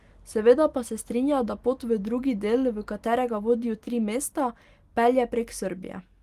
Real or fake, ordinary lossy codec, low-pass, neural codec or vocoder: real; Opus, 24 kbps; 14.4 kHz; none